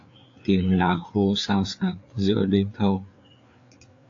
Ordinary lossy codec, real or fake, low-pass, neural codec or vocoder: AAC, 48 kbps; fake; 7.2 kHz; codec, 16 kHz, 4 kbps, FreqCodec, larger model